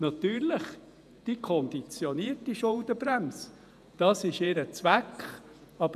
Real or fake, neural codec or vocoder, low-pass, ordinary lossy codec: fake; vocoder, 48 kHz, 128 mel bands, Vocos; 14.4 kHz; none